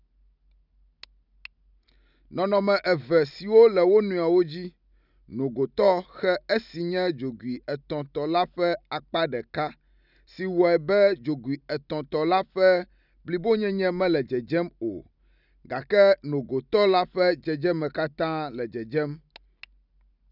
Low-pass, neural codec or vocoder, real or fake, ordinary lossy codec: 5.4 kHz; none; real; none